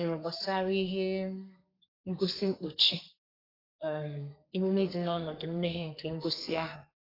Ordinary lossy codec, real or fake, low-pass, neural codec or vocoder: AAC, 24 kbps; fake; 5.4 kHz; codec, 32 kHz, 1.9 kbps, SNAC